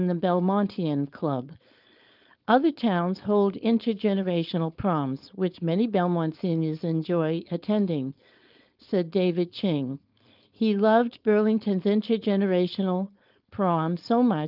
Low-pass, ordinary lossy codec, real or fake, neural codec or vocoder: 5.4 kHz; Opus, 24 kbps; fake; codec, 16 kHz, 4.8 kbps, FACodec